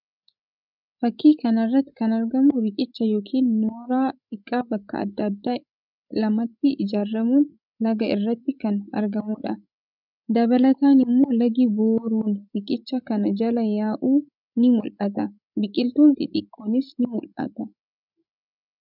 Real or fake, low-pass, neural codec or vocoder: fake; 5.4 kHz; codec, 16 kHz, 16 kbps, FreqCodec, larger model